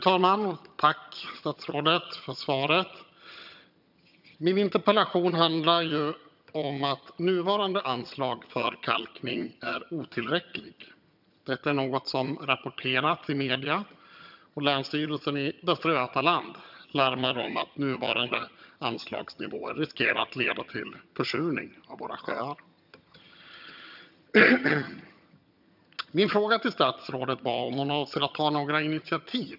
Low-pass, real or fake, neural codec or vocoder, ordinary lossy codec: 5.4 kHz; fake; vocoder, 22.05 kHz, 80 mel bands, HiFi-GAN; none